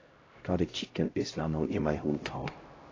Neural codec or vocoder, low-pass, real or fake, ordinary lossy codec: codec, 16 kHz, 0.5 kbps, X-Codec, HuBERT features, trained on LibriSpeech; 7.2 kHz; fake; AAC, 32 kbps